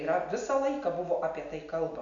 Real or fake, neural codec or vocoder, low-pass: real; none; 7.2 kHz